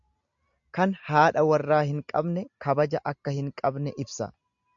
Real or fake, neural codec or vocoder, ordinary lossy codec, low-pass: real; none; AAC, 64 kbps; 7.2 kHz